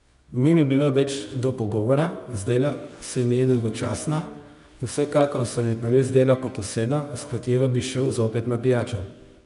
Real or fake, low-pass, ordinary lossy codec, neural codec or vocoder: fake; 10.8 kHz; none; codec, 24 kHz, 0.9 kbps, WavTokenizer, medium music audio release